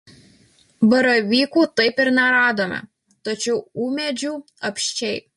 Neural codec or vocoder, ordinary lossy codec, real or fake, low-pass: none; MP3, 48 kbps; real; 14.4 kHz